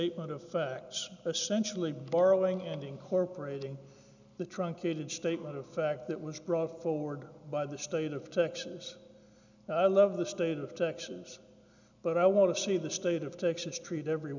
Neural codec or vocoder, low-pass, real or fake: none; 7.2 kHz; real